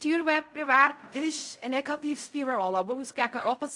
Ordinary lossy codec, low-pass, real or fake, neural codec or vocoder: AAC, 64 kbps; 10.8 kHz; fake; codec, 16 kHz in and 24 kHz out, 0.4 kbps, LongCat-Audio-Codec, fine tuned four codebook decoder